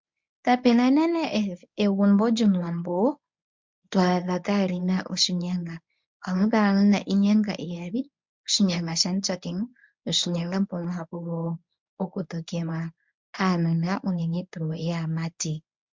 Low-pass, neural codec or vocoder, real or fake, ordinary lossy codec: 7.2 kHz; codec, 24 kHz, 0.9 kbps, WavTokenizer, medium speech release version 1; fake; MP3, 64 kbps